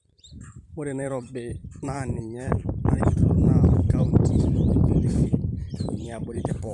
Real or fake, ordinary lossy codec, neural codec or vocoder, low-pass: fake; none; vocoder, 44.1 kHz, 128 mel bands every 512 samples, BigVGAN v2; 10.8 kHz